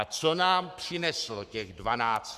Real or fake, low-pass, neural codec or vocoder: fake; 14.4 kHz; codec, 44.1 kHz, 7.8 kbps, Pupu-Codec